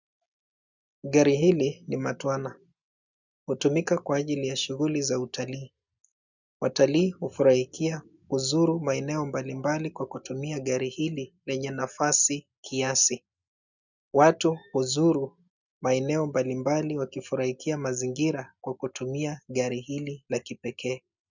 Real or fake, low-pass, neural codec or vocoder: real; 7.2 kHz; none